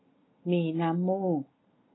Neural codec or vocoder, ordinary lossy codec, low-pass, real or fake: none; AAC, 16 kbps; 7.2 kHz; real